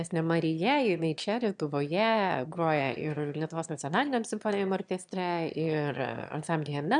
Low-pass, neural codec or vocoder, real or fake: 9.9 kHz; autoencoder, 22.05 kHz, a latent of 192 numbers a frame, VITS, trained on one speaker; fake